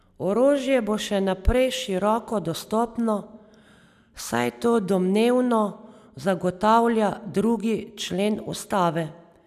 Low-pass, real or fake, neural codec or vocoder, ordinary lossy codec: 14.4 kHz; real; none; none